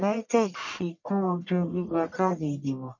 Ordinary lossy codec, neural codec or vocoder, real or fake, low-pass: none; codec, 44.1 kHz, 1.7 kbps, Pupu-Codec; fake; 7.2 kHz